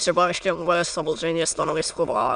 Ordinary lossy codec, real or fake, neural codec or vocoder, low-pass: AAC, 96 kbps; fake; autoencoder, 22.05 kHz, a latent of 192 numbers a frame, VITS, trained on many speakers; 9.9 kHz